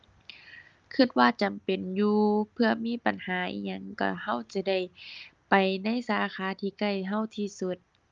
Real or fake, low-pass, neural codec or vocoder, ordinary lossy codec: real; 7.2 kHz; none; Opus, 32 kbps